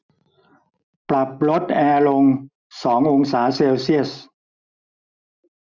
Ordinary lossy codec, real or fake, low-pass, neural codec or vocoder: none; real; 7.2 kHz; none